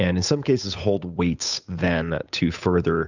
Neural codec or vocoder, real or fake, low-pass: codec, 16 kHz, 8 kbps, FunCodec, trained on Chinese and English, 25 frames a second; fake; 7.2 kHz